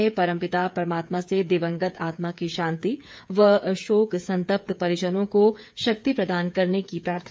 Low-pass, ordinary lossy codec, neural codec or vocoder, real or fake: none; none; codec, 16 kHz, 8 kbps, FreqCodec, smaller model; fake